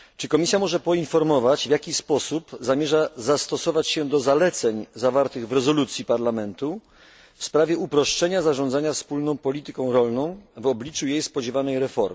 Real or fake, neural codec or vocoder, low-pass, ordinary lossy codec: real; none; none; none